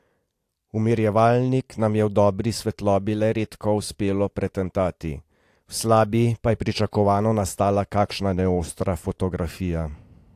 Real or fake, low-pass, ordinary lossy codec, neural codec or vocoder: real; 14.4 kHz; AAC, 64 kbps; none